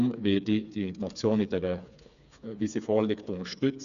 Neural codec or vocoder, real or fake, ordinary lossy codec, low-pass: codec, 16 kHz, 4 kbps, FreqCodec, smaller model; fake; none; 7.2 kHz